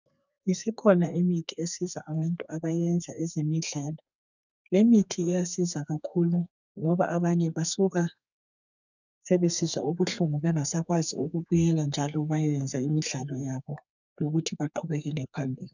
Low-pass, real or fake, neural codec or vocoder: 7.2 kHz; fake; codec, 32 kHz, 1.9 kbps, SNAC